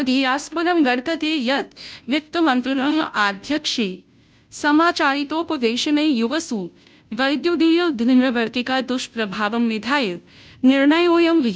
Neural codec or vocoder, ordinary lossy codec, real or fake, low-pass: codec, 16 kHz, 0.5 kbps, FunCodec, trained on Chinese and English, 25 frames a second; none; fake; none